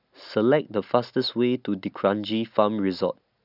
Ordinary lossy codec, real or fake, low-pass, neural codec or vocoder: none; real; 5.4 kHz; none